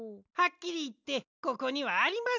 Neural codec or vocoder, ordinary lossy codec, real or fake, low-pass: none; none; real; 7.2 kHz